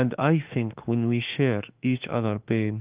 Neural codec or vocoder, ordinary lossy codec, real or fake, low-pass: autoencoder, 48 kHz, 32 numbers a frame, DAC-VAE, trained on Japanese speech; Opus, 24 kbps; fake; 3.6 kHz